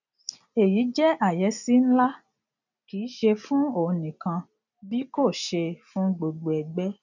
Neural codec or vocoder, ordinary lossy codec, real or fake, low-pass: none; none; real; 7.2 kHz